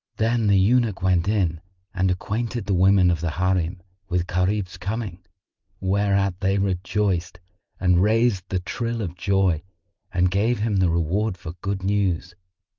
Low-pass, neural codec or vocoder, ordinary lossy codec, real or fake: 7.2 kHz; none; Opus, 24 kbps; real